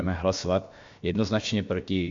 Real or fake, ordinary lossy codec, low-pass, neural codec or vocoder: fake; MP3, 48 kbps; 7.2 kHz; codec, 16 kHz, about 1 kbps, DyCAST, with the encoder's durations